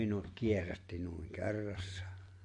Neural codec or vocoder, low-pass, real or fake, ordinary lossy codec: none; 10.8 kHz; real; MP3, 48 kbps